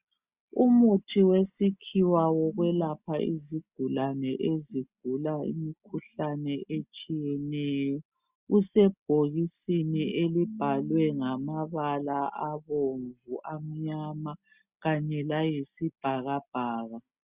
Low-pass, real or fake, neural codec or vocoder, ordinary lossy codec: 3.6 kHz; real; none; Opus, 64 kbps